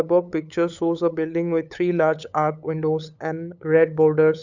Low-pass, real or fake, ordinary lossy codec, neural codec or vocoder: 7.2 kHz; fake; none; codec, 16 kHz, 8 kbps, FunCodec, trained on LibriTTS, 25 frames a second